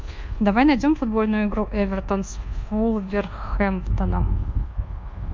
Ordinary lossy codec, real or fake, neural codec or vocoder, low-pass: MP3, 48 kbps; fake; codec, 24 kHz, 1.2 kbps, DualCodec; 7.2 kHz